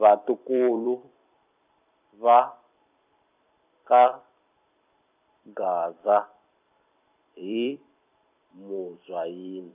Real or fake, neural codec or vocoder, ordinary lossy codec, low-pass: fake; vocoder, 44.1 kHz, 128 mel bands every 256 samples, BigVGAN v2; none; 3.6 kHz